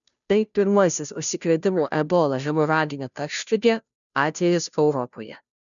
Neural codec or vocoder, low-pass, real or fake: codec, 16 kHz, 0.5 kbps, FunCodec, trained on Chinese and English, 25 frames a second; 7.2 kHz; fake